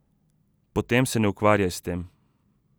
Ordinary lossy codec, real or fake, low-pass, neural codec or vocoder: none; real; none; none